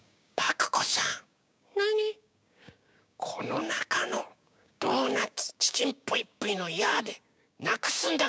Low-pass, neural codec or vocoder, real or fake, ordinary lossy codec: none; codec, 16 kHz, 6 kbps, DAC; fake; none